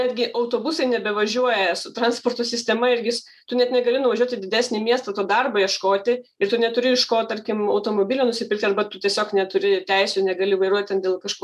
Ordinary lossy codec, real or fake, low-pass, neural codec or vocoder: MP3, 96 kbps; real; 14.4 kHz; none